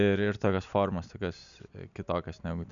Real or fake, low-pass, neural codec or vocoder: real; 7.2 kHz; none